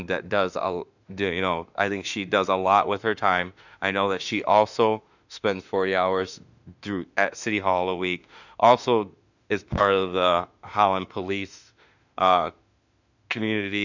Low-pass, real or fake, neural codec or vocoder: 7.2 kHz; fake; autoencoder, 48 kHz, 32 numbers a frame, DAC-VAE, trained on Japanese speech